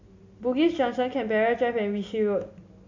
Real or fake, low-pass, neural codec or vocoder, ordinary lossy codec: real; 7.2 kHz; none; AAC, 48 kbps